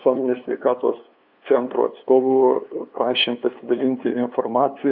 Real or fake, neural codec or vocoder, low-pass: fake; codec, 16 kHz, 2 kbps, FunCodec, trained on LibriTTS, 25 frames a second; 5.4 kHz